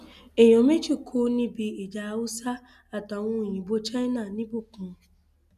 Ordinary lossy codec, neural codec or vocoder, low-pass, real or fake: none; none; 14.4 kHz; real